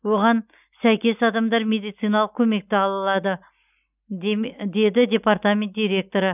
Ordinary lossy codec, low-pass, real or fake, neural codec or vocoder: none; 3.6 kHz; real; none